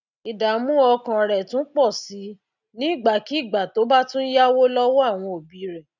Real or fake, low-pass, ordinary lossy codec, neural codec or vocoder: real; 7.2 kHz; none; none